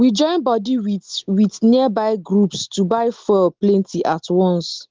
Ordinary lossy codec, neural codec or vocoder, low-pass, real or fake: Opus, 16 kbps; none; 7.2 kHz; real